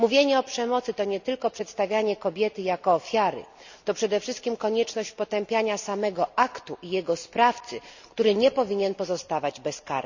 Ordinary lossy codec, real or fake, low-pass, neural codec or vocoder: none; real; 7.2 kHz; none